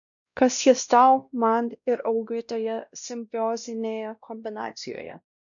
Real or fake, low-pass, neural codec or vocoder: fake; 7.2 kHz; codec, 16 kHz, 1 kbps, X-Codec, WavLM features, trained on Multilingual LibriSpeech